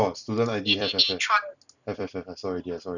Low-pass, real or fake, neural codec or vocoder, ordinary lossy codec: 7.2 kHz; fake; vocoder, 44.1 kHz, 128 mel bands every 256 samples, BigVGAN v2; none